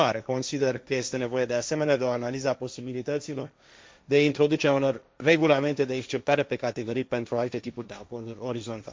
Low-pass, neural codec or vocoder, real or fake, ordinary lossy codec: none; codec, 16 kHz, 1.1 kbps, Voila-Tokenizer; fake; none